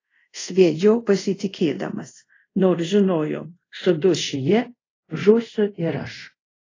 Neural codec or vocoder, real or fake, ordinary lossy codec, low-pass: codec, 24 kHz, 0.5 kbps, DualCodec; fake; AAC, 32 kbps; 7.2 kHz